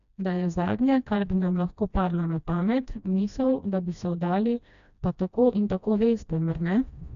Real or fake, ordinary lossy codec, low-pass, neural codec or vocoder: fake; none; 7.2 kHz; codec, 16 kHz, 1 kbps, FreqCodec, smaller model